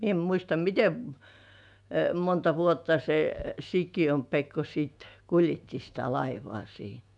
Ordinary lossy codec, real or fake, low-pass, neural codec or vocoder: none; fake; 10.8 kHz; autoencoder, 48 kHz, 128 numbers a frame, DAC-VAE, trained on Japanese speech